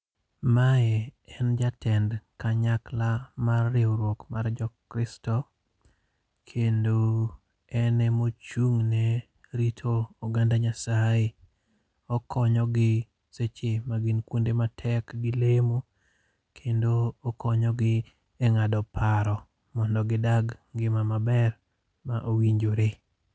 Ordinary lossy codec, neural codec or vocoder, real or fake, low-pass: none; none; real; none